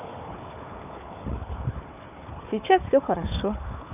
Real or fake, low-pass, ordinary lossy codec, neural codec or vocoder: fake; 3.6 kHz; none; codec, 16 kHz, 4 kbps, FunCodec, trained on Chinese and English, 50 frames a second